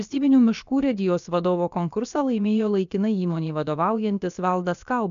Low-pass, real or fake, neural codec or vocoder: 7.2 kHz; fake; codec, 16 kHz, about 1 kbps, DyCAST, with the encoder's durations